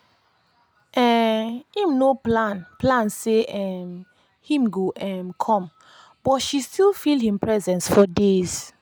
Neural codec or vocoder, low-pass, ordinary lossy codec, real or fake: none; none; none; real